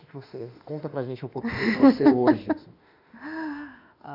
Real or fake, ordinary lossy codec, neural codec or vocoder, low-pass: fake; none; autoencoder, 48 kHz, 32 numbers a frame, DAC-VAE, trained on Japanese speech; 5.4 kHz